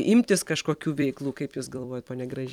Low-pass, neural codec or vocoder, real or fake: 19.8 kHz; vocoder, 44.1 kHz, 128 mel bands every 256 samples, BigVGAN v2; fake